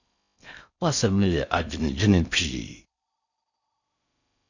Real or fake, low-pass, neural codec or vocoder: fake; 7.2 kHz; codec, 16 kHz in and 24 kHz out, 0.6 kbps, FocalCodec, streaming, 4096 codes